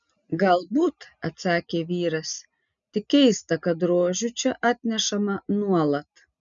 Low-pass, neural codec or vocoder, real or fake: 7.2 kHz; none; real